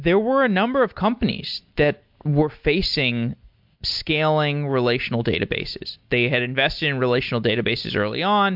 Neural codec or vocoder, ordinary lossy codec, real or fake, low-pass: none; MP3, 48 kbps; real; 5.4 kHz